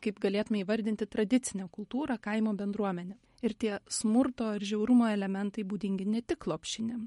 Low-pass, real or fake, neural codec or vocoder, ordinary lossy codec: 19.8 kHz; real; none; MP3, 48 kbps